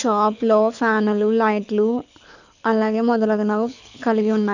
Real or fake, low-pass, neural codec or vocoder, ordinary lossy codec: fake; 7.2 kHz; codec, 24 kHz, 6 kbps, HILCodec; none